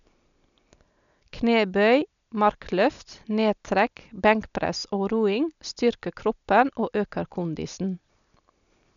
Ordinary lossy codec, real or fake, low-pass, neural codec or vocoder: none; real; 7.2 kHz; none